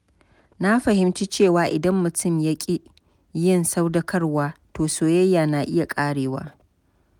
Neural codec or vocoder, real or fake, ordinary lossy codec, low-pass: none; real; none; 19.8 kHz